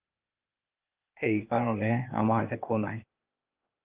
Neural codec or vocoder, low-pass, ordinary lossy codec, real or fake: codec, 16 kHz, 0.8 kbps, ZipCodec; 3.6 kHz; Opus, 32 kbps; fake